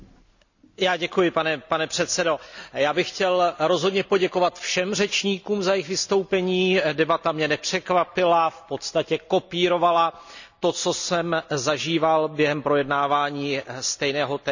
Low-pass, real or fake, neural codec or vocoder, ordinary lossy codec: 7.2 kHz; real; none; none